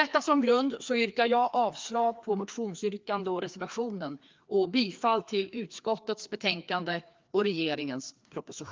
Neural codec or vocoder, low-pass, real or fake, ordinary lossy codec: codec, 16 kHz, 2 kbps, FreqCodec, larger model; 7.2 kHz; fake; Opus, 32 kbps